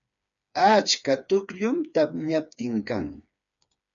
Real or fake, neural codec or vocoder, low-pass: fake; codec, 16 kHz, 4 kbps, FreqCodec, smaller model; 7.2 kHz